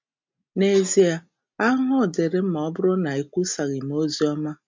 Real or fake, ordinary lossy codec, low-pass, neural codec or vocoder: real; MP3, 64 kbps; 7.2 kHz; none